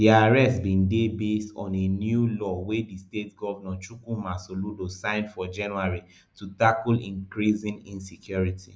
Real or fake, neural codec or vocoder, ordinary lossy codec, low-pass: real; none; none; none